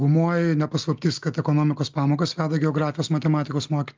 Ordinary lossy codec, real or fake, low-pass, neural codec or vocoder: Opus, 24 kbps; real; 7.2 kHz; none